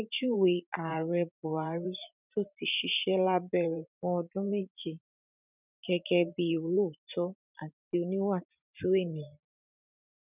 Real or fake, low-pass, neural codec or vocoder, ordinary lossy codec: fake; 3.6 kHz; vocoder, 44.1 kHz, 128 mel bands every 512 samples, BigVGAN v2; none